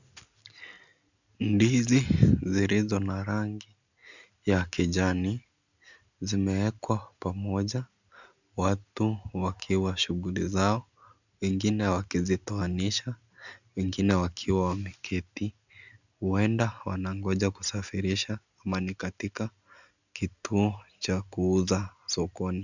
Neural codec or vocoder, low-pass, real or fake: none; 7.2 kHz; real